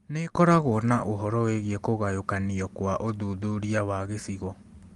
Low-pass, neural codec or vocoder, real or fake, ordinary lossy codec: 10.8 kHz; none; real; Opus, 24 kbps